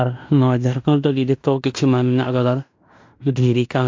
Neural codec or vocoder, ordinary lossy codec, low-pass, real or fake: codec, 16 kHz in and 24 kHz out, 0.9 kbps, LongCat-Audio-Codec, fine tuned four codebook decoder; MP3, 64 kbps; 7.2 kHz; fake